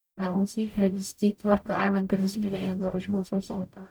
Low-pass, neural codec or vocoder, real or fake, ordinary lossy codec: none; codec, 44.1 kHz, 0.9 kbps, DAC; fake; none